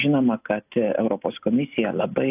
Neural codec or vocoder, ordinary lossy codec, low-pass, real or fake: none; AAC, 32 kbps; 3.6 kHz; real